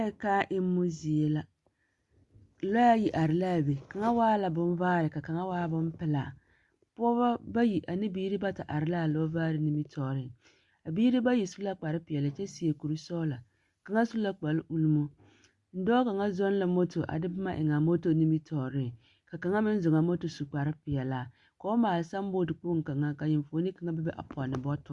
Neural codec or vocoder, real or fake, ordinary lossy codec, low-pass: none; real; Opus, 64 kbps; 10.8 kHz